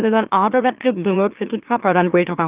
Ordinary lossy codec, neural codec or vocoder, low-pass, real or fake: Opus, 24 kbps; autoencoder, 44.1 kHz, a latent of 192 numbers a frame, MeloTTS; 3.6 kHz; fake